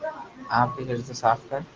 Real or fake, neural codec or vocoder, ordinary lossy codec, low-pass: real; none; Opus, 32 kbps; 7.2 kHz